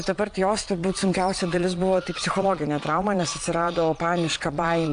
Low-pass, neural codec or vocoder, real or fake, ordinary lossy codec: 9.9 kHz; vocoder, 22.05 kHz, 80 mel bands, Vocos; fake; AAC, 64 kbps